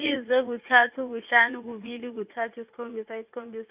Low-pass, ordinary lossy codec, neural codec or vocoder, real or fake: 3.6 kHz; Opus, 64 kbps; vocoder, 44.1 kHz, 80 mel bands, Vocos; fake